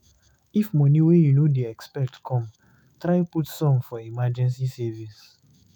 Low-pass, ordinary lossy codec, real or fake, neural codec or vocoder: 19.8 kHz; none; fake; autoencoder, 48 kHz, 128 numbers a frame, DAC-VAE, trained on Japanese speech